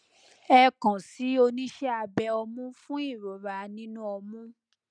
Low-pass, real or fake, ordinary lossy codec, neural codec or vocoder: 9.9 kHz; real; none; none